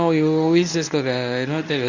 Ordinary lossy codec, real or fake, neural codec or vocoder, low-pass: none; fake; codec, 24 kHz, 0.9 kbps, WavTokenizer, medium speech release version 1; 7.2 kHz